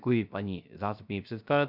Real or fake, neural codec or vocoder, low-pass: fake; codec, 16 kHz, 0.3 kbps, FocalCodec; 5.4 kHz